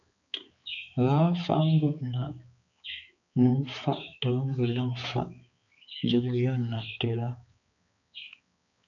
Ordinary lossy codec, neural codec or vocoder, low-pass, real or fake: MP3, 96 kbps; codec, 16 kHz, 4 kbps, X-Codec, HuBERT features, trained on balanced general audio; 7.2 kHz; fake